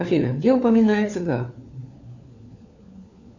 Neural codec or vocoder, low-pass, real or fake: codec, 16 kHz, 4 kbps, FunCodec, trained on LibriTTS, 50 frames a second; 7.2 kHz; fake